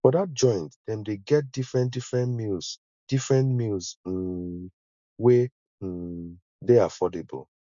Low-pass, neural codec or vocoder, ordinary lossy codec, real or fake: 7.2 kHz; none; MP3, 64 kbps; real